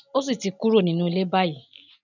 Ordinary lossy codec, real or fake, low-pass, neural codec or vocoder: none; real; 7.2 kHz; none